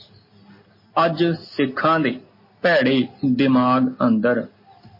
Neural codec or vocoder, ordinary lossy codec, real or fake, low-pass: none; MP3, 24 kbps; real; 5.4 kHz